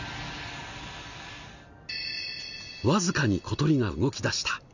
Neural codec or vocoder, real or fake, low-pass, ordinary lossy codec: none; real; 7.2 kHz; none